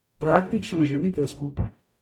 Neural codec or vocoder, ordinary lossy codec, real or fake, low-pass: codec, 44.1 kHz, 0.9 kbps, DAC; none; fake; 19.8 kHz